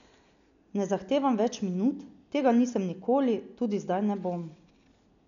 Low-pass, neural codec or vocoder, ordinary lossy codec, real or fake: 7.2 kHz; none; none; real